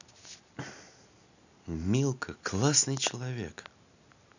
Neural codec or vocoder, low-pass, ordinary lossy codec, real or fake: none; 7.2 kHz; none; real